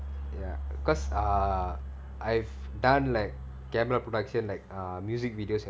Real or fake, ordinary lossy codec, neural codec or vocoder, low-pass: real; none; none; none